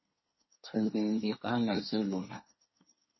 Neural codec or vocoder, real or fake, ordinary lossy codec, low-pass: codec, 24 kHz, 1 kbps, SNAC; fake; MP3, 24 kbps; 7.2 kHz